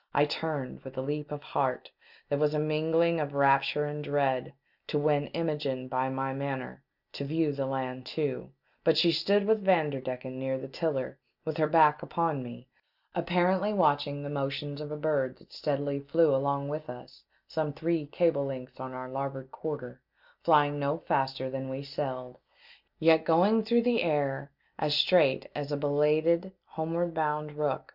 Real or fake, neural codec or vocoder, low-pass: real; none; 5.4 kHz